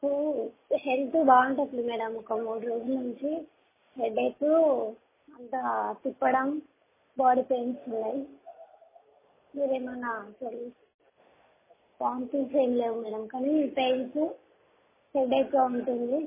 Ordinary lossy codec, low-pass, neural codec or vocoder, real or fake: MP3, 16 kbps; 3.6 kHz; none; real